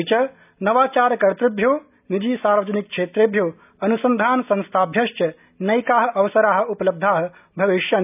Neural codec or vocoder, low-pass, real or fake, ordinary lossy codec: none; 3.6 kHz; real; none